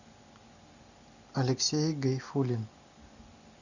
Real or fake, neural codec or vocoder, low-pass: real; none; 7.2 kHz